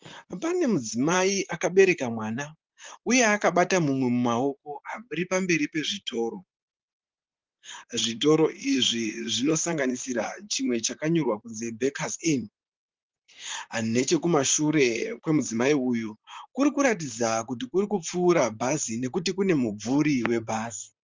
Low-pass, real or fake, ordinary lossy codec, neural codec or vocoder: 7.2 kHz; fake; Opus, 32 kbps; vocoder, 44.1 kHz, 128 mel bands, Pupu-Vocoder